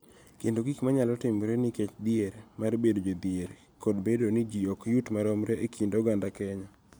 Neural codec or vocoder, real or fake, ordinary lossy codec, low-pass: none; real; none; none